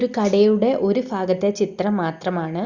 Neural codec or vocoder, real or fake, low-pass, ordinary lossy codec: none; real; 7.2 kHz; Opus, 64 kbps